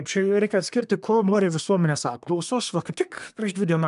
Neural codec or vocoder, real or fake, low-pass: codec, 24 kHz, 1 kbps, SNAC; fake; 10.8 kHz